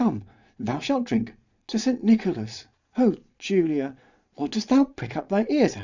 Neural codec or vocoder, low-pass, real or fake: vocoder, 22.05 kHz, 80 mel bands, Vocos; 7.2 kHz; fake